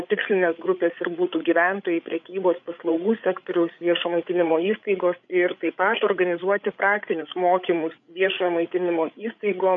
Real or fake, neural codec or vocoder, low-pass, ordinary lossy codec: fake; codec, 16 kHz, 8 kbps, FreqCodec, larger model; 7.2 kHz; AAC, 64 kbps